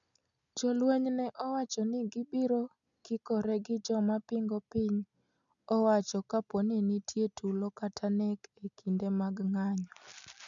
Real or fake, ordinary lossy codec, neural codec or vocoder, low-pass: real; none; none; 7.2 kHz